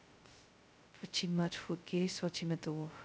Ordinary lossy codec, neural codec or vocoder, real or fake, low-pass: none; codec, 16 kHz, 0.2 kbps, FocalCodec; fake; none